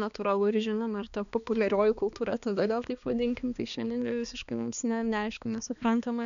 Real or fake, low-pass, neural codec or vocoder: fake; 7.2 kHz; codec, 16 kHz, 2 kbps, X-Codec, HuBERT features, trained on balanced general audio